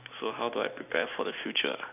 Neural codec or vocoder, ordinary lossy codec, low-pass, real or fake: none; none; 3.6 kHz; real